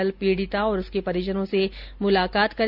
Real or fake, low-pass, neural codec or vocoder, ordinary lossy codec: real; 5.4 kHz; none; none